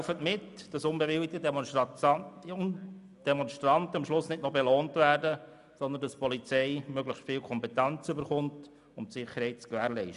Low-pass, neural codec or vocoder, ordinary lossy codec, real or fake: 10.8 kHz; none; none; real